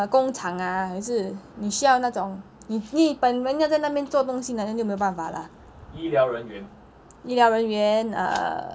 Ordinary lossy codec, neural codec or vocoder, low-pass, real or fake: none; none; none; real